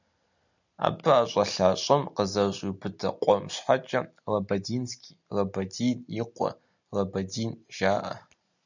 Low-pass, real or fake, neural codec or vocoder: 7.2 kHz; real; none